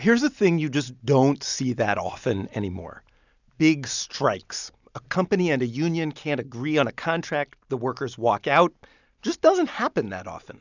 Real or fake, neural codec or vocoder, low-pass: real; none; 7.2 kHz